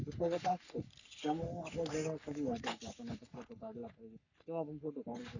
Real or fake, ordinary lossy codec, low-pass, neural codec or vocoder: fake; none; 7.2 kHz; codec, 44.1 kHz, 3.4 kbps, Pupu-Codec